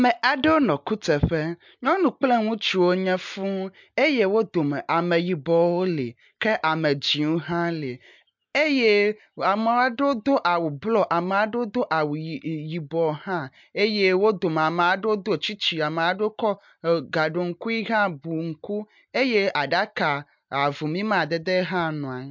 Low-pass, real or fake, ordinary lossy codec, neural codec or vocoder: 7.2 kHz; real; MP3, 64 kbps; none